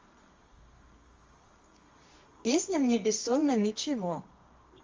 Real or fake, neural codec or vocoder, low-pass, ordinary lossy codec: fake; codec, 24 kHz, 0.9 kbps, WavTokenizer, medium music audio release; 7.2 kHz; Opus, 32 kbps